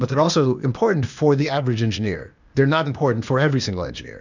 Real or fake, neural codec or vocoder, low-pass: fake; codec, 16 kHz, 0.8 kbps, ZipCodec; 7.2 kHz